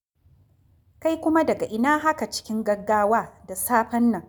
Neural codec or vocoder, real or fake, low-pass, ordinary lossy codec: none; real; none; none